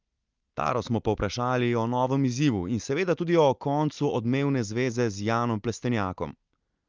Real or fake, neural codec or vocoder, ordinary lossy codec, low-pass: real; none; Opus, 24 kbps; 7.2 kHz